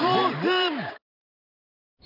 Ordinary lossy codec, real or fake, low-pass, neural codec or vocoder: none; fake; 5.4 kHz; vocoder, 44.1 kHz, 128 mel bands every 512 samples, BigVGAN v2